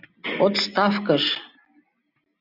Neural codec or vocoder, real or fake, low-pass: none; real; 5.4 kHz